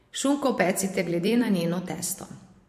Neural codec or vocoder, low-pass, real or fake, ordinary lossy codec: vocoder, 44.1 kHz, 128 mel bands every 512 samples, BigVGAN v2; 14.4 kHz; fake; MP3, 64 kbps